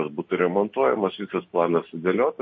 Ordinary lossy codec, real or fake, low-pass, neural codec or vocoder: MP3, 32 kbps; real; 7.2 kHz; none